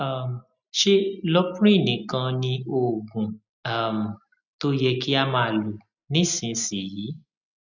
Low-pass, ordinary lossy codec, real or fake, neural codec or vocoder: 7.2 kHz; none; real; none